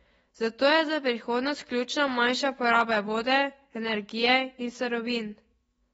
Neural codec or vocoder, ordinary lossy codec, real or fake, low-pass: autoencoder, 48 kHz, 128 numbers a frame, DAC-VAE, trained on Japanese speech; AAC, 24 kbps; fake; 19.8 kHz